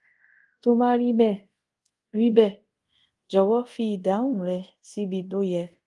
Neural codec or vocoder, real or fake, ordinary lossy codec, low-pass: codec, 24 kHz, 0.5 kbps, DualCodec; fake; Opus, 24 kbps; 10.8 kHz